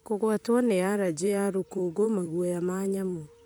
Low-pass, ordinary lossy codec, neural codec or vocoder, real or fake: none; none; vocoder, 44.1 kHz, 128 mel bands, Pupu-Vocoder; fake